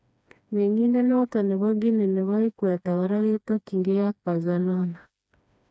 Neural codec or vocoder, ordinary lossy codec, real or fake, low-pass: codec, 16 kHz, 2 kbps, FreqCodec, smaller model; none; fake; none